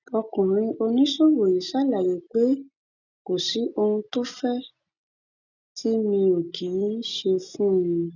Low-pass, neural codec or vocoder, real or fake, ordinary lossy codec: 7.2 kHz; none; real; none